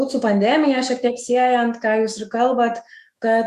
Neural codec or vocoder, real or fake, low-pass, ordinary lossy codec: autoencoder, 48 kHz, 128 numbers a frame, DAC-VAE, trained on Japanese speech; fake; 14.4 kHz; Opus, 64 kbps